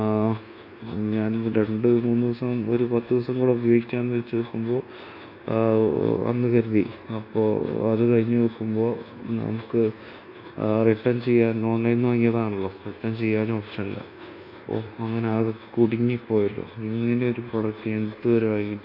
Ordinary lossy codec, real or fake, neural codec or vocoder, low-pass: none; fake; codec, 24 kHz, 1.2 kbps, DualCodec; 5.4 kHz